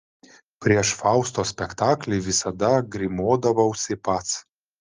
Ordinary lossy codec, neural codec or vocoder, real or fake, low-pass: Opus, 24 kbps; none; real; 7.2 kHz